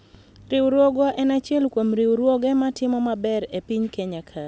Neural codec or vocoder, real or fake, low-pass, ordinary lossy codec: none; real; none; none